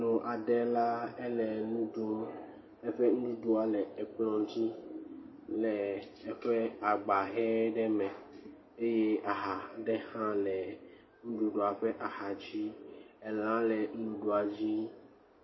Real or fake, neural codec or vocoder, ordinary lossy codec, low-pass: real; none; MP3, 24 kbps; 7.2 kHz